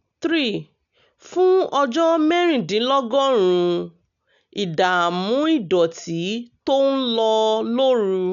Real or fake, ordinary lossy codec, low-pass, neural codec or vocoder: real; none; 7.2 kHz; none